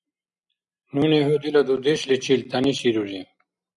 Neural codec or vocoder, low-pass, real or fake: none; 10.8 kHz; real